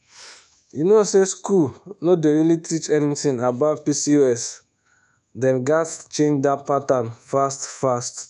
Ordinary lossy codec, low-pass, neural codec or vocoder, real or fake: none; 10.8 kHz; codec, 24 kHz, 1.2 kbps, DualCodec; fake